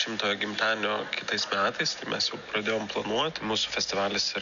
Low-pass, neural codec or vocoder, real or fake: 7.2 kHz; none; real